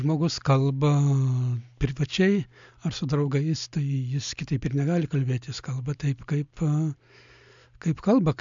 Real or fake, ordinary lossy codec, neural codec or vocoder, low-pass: real; MP3, 64 kbps; none; 7.2 kHz